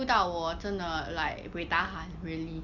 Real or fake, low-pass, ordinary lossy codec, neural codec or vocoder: real; 7.2 kHz; none; none